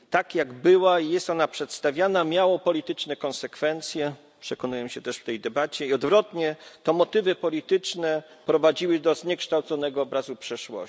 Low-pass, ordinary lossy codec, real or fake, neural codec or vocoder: none; none; real; none